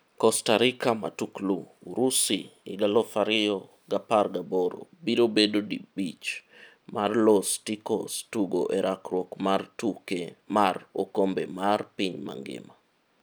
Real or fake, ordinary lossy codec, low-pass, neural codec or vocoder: real; none; none; none